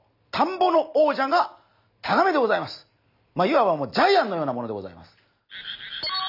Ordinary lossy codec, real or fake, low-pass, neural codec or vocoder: none; real; 5.4 kHz; none